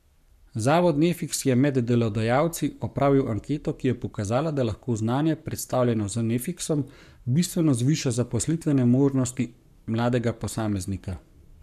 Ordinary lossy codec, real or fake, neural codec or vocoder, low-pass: none; fake; codec, 44.1 kHz, 7.8 kbps, Pupu-Codec; 14.4 kHz